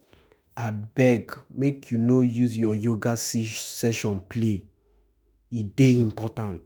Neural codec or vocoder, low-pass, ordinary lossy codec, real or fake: autoencoder, 48 kHz, 32 numbers a frame, DAC-VAE, trained on Japanese speech; none; none; fake